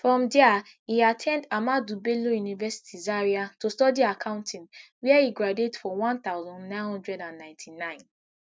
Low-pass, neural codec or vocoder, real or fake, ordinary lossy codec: none; none; real; none